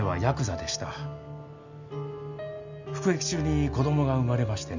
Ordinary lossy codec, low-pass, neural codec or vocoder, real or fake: none; 7.2 kHz; none; real